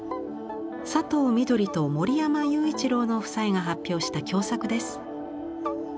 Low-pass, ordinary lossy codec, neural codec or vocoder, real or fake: none; none; none; real